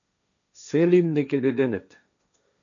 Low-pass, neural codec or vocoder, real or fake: 7.2 kHz; codec, 16 kHz, 1.1 kbps, Voila-Tokenizer; fake